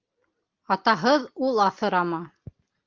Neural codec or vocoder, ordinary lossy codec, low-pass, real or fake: none; Opus, 24 kbps; 7.2 kHz; real